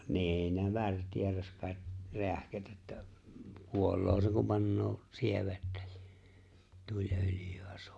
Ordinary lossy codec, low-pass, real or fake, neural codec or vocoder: none; none; real; none